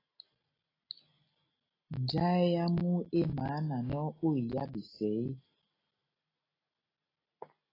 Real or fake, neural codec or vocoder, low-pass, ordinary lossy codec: real; none; 5.4 kHz; AAC, 24 kbps